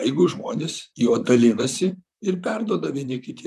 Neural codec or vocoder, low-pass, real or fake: vocoder, 44.1 kHz, 128 mel bands, Pupu-Vocoder; 14.4 kHz; fake